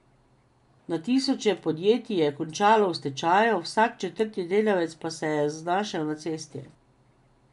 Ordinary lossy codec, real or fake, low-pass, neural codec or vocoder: MP3, 96 kbps; real; 10.8 kHz; none